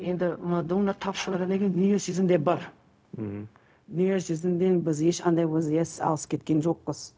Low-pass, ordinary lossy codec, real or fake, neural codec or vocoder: none; none; fake; codec, 16 kHz, 0.4 kbps, LongCat-Audio-Codec